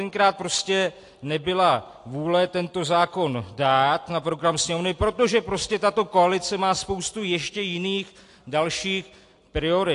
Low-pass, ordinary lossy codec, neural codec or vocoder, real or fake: 10.8 kHz; AAC, 48 kbps; none; real